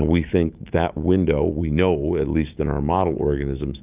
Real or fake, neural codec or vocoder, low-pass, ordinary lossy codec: fake; autoencoder, 48 kHz, 128 numbers a frame, DAC-VAE, trained on Japanese speech; 3.6 kHz; Opus, 24 kbps